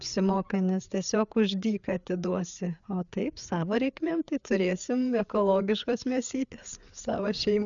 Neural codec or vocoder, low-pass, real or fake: codec, 16 kHz, 4 kbps, FreqCodec, larger model; 7.2 kHz; fake